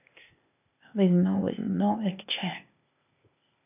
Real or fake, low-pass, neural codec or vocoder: fake; 3.6 kHz; codec, 16 kHz, 0.8 kbps, ZipCodec